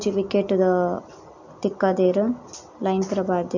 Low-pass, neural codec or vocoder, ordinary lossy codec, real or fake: 7.2 kHz; none; none; real